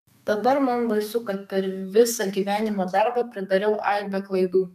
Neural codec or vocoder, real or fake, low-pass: codec, 32 kHz, 1.9 kbps, SNAC; fake; 14.4 kHz